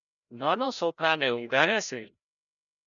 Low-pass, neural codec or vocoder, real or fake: 7.2 kHz; codec, 16 kHz, 0.5 kbps, FreqCodec, larger model; fake